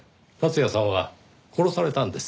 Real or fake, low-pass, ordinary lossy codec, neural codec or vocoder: real; none; none; none